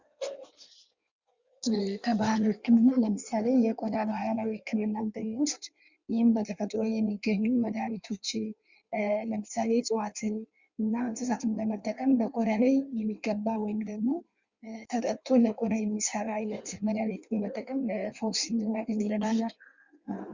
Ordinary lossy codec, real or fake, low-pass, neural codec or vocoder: Opus, 64 kbps; fake; 7.2 kHz; codec, 16 kHz in and 24 kHz out, 1.1 kbps, FireRedTTS-2 codec